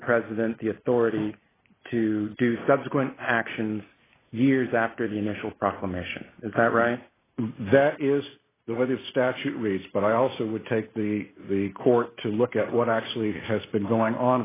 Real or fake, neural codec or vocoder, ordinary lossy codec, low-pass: real; none; AAC, 16 kbps; 3.6 kHz